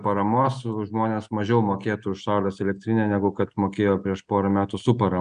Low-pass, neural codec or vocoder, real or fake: 9.9 kHz; none; real